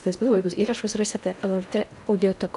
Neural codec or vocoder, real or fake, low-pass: codec, 16 kHz in and 24 kHz out, 0.6 kbps, FocalCodec, streaming, 2048 codes; fake; 10.8 kHz